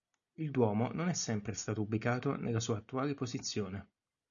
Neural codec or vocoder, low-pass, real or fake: none; 7.2 kHz; real